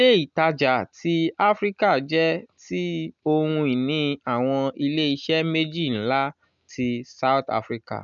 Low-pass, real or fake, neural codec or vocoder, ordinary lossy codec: 7.2 kHz; real; none; none